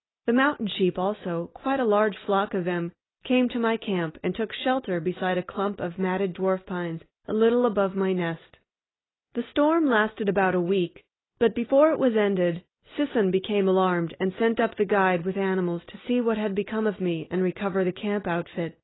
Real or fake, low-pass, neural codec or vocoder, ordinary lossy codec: real; 7.2 kHz; none; AAC, 16 kbps